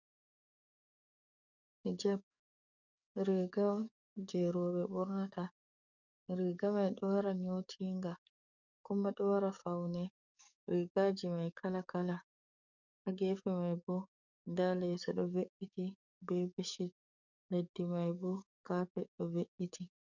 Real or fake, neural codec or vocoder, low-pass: fake; codec, 44.1 kHz, 7.8 kbps, DAC; 7.2 kHz